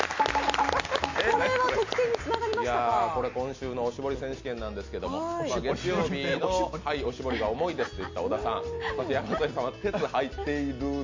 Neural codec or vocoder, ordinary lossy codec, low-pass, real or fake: none; MP3, 48 kbps; 7.2 kHz; real